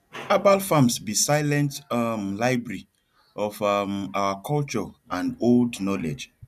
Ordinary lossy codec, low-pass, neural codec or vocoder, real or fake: none; 14.4 kHz; none; real